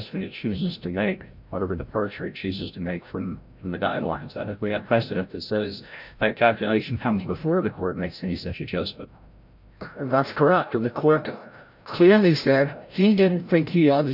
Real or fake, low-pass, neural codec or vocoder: fake; 5.4 kHz; codec, 16 kHz, 0.5 kbps, FreqCodec, larger model